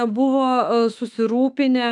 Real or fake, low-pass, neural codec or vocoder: fake; 10.8 kHz; autoencoder, 48 kHz, 32 numbers a frame, DAC-VAE, trained on Japanese speech